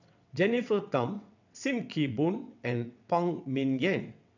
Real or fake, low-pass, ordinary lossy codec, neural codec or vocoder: fake; 7.2 kHz; none; vocoder, 22.05 kHz, 80 mel bands, WaveNeXt